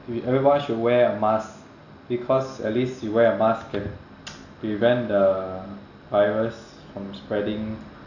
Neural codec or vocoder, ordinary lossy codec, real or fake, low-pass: none; none; real; 7.2 kHz